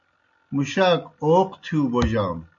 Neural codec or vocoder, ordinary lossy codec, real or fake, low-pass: none; MP3, 48 kbps; real; 7.2 kHz